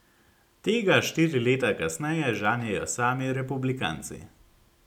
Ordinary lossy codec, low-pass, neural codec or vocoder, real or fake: none; 19.8 kHz; none; real